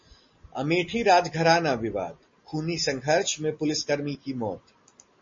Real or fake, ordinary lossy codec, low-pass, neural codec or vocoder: real; MP3, 32 kbps; 7.2 kHz; none